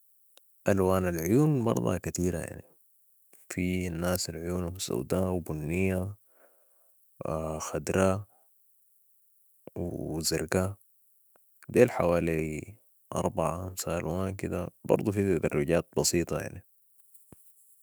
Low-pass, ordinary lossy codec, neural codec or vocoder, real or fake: none; none; autoencoder, 48 kHz, 128 numbers a frame, DAC-VAE, trained on Japanese speech; fake